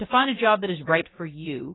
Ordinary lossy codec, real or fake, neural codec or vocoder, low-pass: AAC, 16 kbps; fake; codec, 16 kHz, about 1 kbps, DyCAST, with the encoder's durations; 7.2 kHz